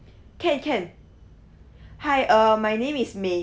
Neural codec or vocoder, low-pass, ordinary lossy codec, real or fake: none; none; none; real